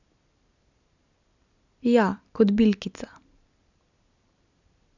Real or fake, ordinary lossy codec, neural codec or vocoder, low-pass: real; none; none; 7.2 kHz